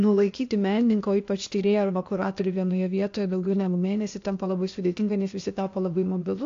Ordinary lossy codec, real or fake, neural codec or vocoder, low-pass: AAC, 48 kbps; fake; codec, 16 kHz, 0.8 kbps, ZipCodec; 7.2 kHz